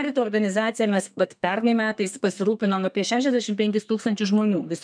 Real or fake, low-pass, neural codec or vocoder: fake; 9.9 kHz; codec, 32 kHz, 1.9 kbps, SNAC